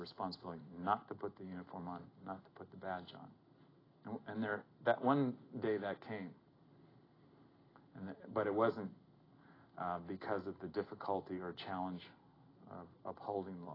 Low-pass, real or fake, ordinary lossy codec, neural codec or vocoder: 5.4 kHz; real; AAC, 24 kbps; none